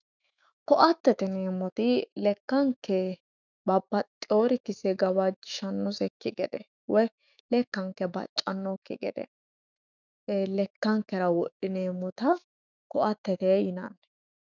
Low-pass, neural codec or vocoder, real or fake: 7.2 kHz; autoencoder, 48 kHz, 128 numbers a frame, DAC-VAE, trained on Japanese speech; fake